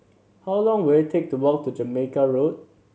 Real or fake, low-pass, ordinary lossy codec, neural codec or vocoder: real; none; none; none